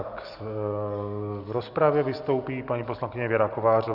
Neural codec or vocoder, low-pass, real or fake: none; 5.4 kHz; real